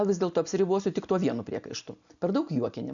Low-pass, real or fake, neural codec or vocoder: 7.2 kHz; real; none